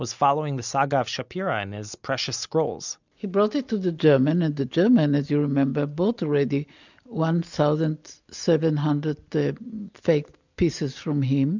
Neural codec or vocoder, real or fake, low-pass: none; real; 7.2 kHz